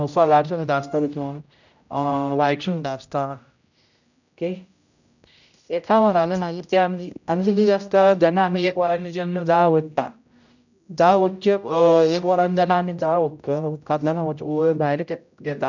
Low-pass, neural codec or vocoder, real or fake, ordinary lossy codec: 7.2 kHz; codec, 16 kHz, 0.5 kbps, X-Codec, HuBERT features, trained on general audio; fake; none